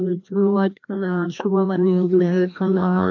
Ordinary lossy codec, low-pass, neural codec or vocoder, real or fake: MP3, 64 kbps; 7.2 kHz; codec, 16 kHz, 1 kbps, FreqCodec, larger model; fake